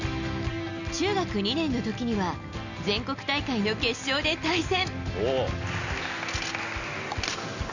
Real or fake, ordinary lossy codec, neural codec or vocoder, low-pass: real; none; none; 7.2 kHz